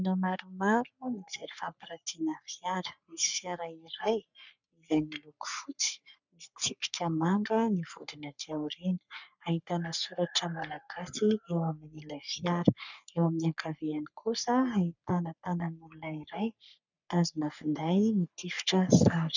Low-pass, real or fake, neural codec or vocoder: 7.2 kHz; fake; codec, 44.1 kHz, 3.4 kbps, Pupu-Codec